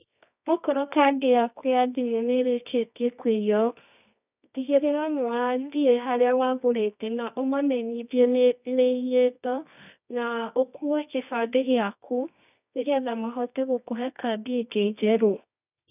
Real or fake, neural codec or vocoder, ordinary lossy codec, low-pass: fake; codec, 24 kHz, 0.9 kbps, WavTokenizer, medium music audio release; none; 3.6 kHz